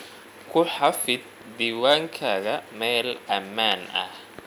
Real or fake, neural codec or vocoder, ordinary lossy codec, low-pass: real; none; none; 19.8 kHz